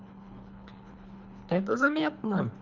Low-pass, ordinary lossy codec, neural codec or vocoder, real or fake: 7.2 kHz; Opus, 64 kbps; codec, 24 kHz, 3 kbps, HILCodec; fake